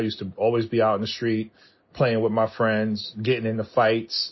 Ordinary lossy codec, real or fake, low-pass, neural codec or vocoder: MP3, 24 kbps; real; 7.2 kHz; none